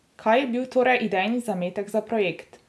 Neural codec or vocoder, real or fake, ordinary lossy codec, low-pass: none; real; none; none